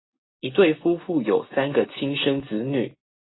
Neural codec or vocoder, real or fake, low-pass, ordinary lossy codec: none; real; 7.2 kHz; AAC, 16 kbps